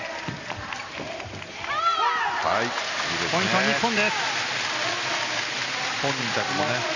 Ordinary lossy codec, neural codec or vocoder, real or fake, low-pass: none; none; real; 7.2 kHz